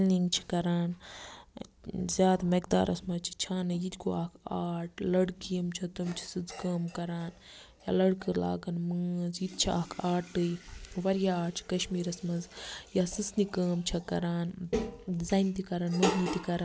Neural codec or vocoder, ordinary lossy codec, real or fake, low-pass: none; none; real; none